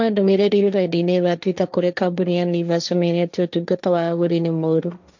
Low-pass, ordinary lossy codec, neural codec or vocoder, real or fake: 7.2 kHz; none; codec, 16 kHz, 1.1 kbps, Voila-Tokenizer; fake